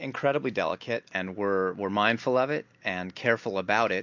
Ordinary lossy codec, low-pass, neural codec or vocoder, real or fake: MP3, 64 kbps; 7.2 kHz; none; real